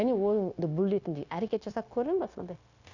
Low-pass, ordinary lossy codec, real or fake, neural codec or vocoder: 7.2 kHz; none; fake; codec, 16 kHz, 0.9 kbps, LongCat-Audio-Codec